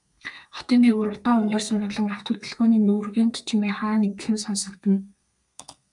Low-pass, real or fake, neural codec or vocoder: 10.8 kHz; fake; codec, 32 kHz, 1.9 kbps, SNAC